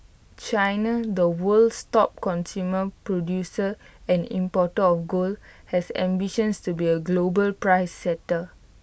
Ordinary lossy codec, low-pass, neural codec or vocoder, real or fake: none; none; none; real